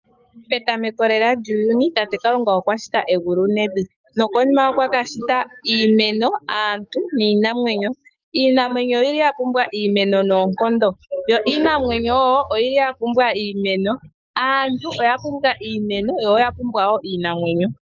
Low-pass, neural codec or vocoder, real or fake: 7.2 kHz; codec, 44.1 kHz, 7.8 kbps, DAC; fake